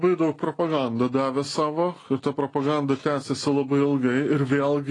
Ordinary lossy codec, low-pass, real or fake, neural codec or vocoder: AAC, 32 kbps; 10.8 kHz; real; none